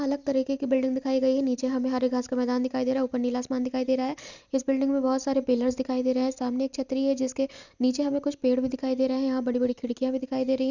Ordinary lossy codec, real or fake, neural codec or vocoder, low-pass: none; real; none; 7.2 kHz